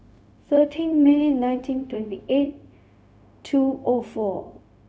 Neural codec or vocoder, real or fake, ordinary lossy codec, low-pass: codec, 16 kHz, 0.4 kbps, LongCat-Audio-Codec; fake; none; none